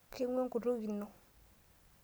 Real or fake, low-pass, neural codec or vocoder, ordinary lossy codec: real; none; none; none